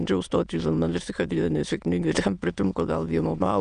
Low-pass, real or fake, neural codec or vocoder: 9.9 kHz; fake; autoencoder, 22.05 kHz, a latent of 192 numbers a frame, VITS, trained on many speakers